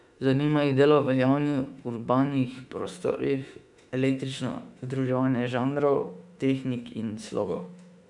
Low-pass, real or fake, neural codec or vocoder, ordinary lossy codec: 10.8 kHz; fake; autoencoder, 48 kHz, 32 numbers a frame, DAC-VAE, trained on Japanese speech; none